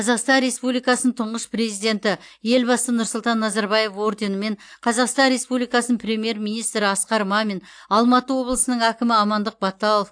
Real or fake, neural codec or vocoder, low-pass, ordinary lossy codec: real; none; 9.9 kHz; AAC, 64 kbps